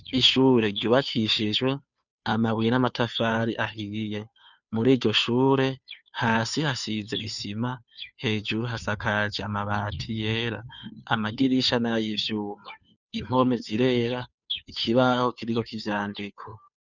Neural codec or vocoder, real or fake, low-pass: codec, 16 kHz, 2 kbps, FunCodec, trained on Chinese and English, 25 frames a second; fake; 7.2 kHz